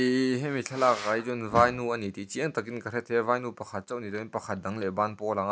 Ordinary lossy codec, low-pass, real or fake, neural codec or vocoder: none; none; real; none